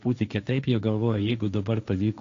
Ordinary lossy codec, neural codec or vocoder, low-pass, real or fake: AAC, 48 kbps; codec, 16 kHz, 1.1 kbps, Voila-Tokenizer; 7.2 kHz; fake